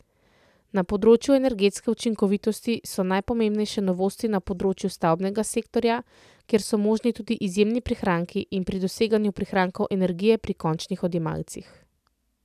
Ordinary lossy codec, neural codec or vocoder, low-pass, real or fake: none; none; 14.4 kHz; real